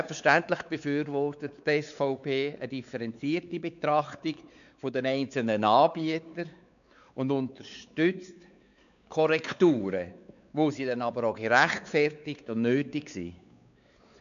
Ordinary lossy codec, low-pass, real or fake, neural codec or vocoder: none; 7.2 kHz; fake; codec, 16 kHz, 4 kbps, X-Codec, WavLM features, trained on Multilingual LibriSpeech